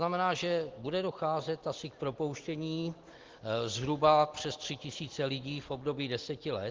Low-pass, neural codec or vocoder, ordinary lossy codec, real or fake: 7.2 kHz; none; Opus, 16 kbps; real